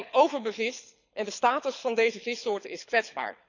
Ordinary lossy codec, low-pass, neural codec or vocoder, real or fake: none; 7.2 kHz; codec, 24 kHz, 6 kbps, HILCodec; fake